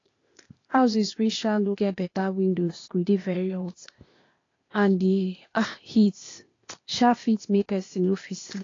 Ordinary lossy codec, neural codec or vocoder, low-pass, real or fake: AAC, 32 kbps; codec, 16 kHz, 0.8 kbps, ZipCodec; 7.2 kHz; fake